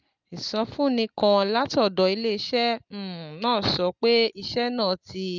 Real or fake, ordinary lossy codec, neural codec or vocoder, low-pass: real; Opus, 32 kbps; none; 7.2 kHz